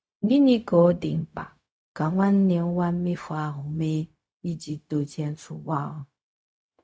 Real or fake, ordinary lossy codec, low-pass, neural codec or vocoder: fake; none; none; codec, 16 kHz, 0.4 kbps, LongCat-Audio-Codec